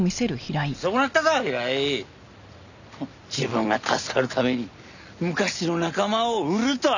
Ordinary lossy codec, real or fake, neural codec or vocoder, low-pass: none; real; none; 7.2 kHz